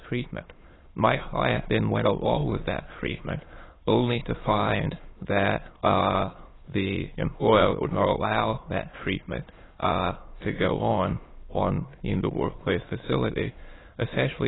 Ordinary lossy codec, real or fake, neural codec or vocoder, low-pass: AAC, 16 kbps; fake; autoencoder, 22.05 kHz, a latent of 192 numbers a frame, VITS, trained on many speakers; 7.2 kHz